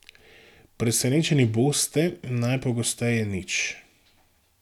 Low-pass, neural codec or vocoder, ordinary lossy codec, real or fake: 19.8 kHz; none; none; real